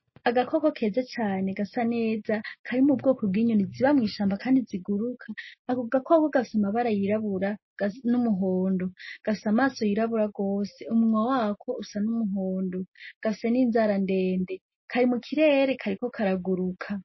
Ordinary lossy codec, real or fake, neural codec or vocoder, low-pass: MP3, 24 kbps; real; none; 7.2 kHz